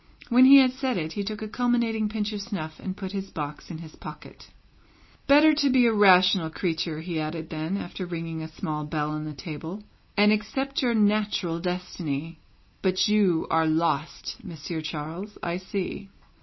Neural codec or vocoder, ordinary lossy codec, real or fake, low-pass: none; MP3, 24 kbps; real; 7.2 kHz